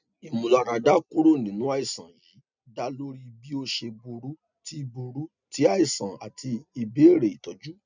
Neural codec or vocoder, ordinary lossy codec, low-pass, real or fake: none; none; 7.2 kHz; real